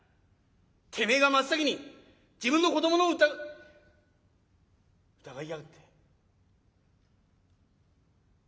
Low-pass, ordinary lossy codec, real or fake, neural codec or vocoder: none; none; real; none